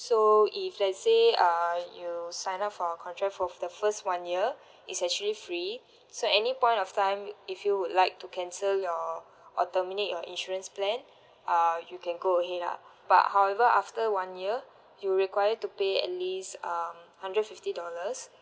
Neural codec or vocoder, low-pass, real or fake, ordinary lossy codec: none; none; real; none